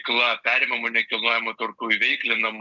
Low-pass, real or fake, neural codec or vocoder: 7.2 kHz; real; none